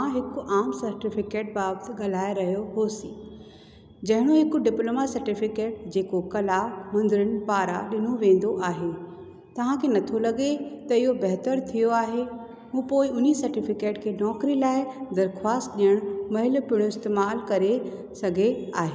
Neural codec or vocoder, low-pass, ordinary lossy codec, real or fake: none; none; none; real